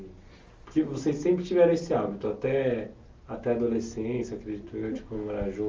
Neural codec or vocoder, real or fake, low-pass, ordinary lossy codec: none; real; 7.2 kHz; Opus, 32 kbps